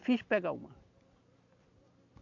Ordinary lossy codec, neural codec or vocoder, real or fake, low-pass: none; none; real; 7.2 kHz